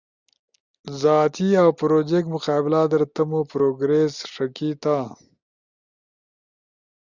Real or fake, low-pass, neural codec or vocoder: real; 7.2 kHz; none